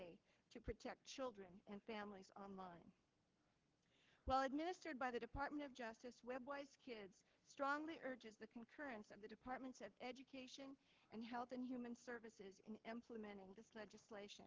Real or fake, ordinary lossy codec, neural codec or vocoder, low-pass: fake; Opus, 16 kbps; codec, 44.1 kHz, 7.8 kbps, Pupu-Codec; 7.2 kHz